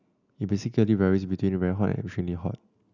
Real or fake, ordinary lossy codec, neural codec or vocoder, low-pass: real; none; none; 7.2 kHz